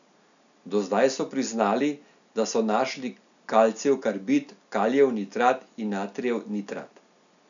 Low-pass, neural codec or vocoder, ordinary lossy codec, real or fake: 7.2 kHz; none; none; real